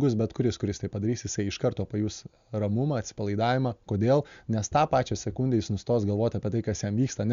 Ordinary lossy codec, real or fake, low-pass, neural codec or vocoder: Opus, 64 kbps; real; 7.2 kHz; none